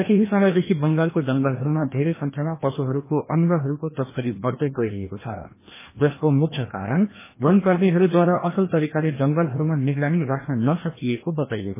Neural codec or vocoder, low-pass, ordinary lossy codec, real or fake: codec, 16 kHz, 1 kbps, FreqCodec, larger model; 3.6 kHz; MP3, 16 kbps; fake